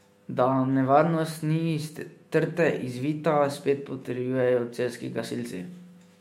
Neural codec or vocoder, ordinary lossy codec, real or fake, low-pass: autoencoder, 48 kHz, 128 numbers a frame, DAC-VAE, trained on Japanese speech; MP3, 64 kbps; fake; 19.8 kHz